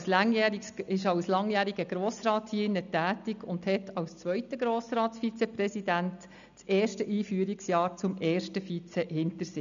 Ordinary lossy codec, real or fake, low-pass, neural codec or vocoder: none; real; 7.2 kHz; none